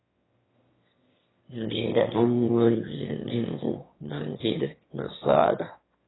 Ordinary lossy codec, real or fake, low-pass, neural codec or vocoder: AAC, 16 kbps; fake; 7.2 kHz; autoencoder, 22.05 kHz, a latent of 192 numbers a frame, VITS, trained on one speaker